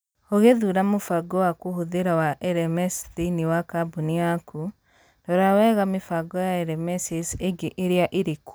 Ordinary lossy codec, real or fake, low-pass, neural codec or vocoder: none; real; none; none